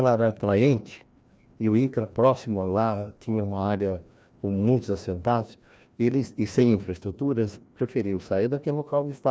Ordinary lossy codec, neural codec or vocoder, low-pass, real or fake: none; codec, 16 kHz, 1 kbps, FreqCodec, larger model; none; fake